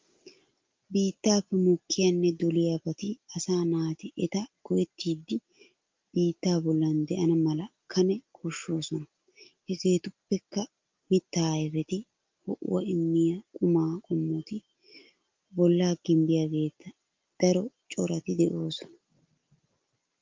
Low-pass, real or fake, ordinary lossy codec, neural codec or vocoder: 7.2 kHz; real; Opus, 24 kbps; none